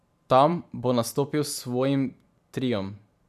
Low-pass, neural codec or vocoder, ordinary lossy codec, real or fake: 14.4 kHz; vocoder, 44.1 kHz, 128 mel bands every 512 samples, BigVGAN v2; none; fake